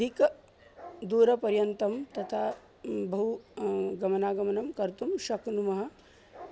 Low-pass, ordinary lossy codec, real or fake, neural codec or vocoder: none; none; real; none